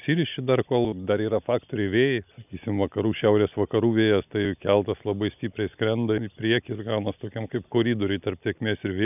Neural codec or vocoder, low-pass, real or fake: none; 3.6 kHz; real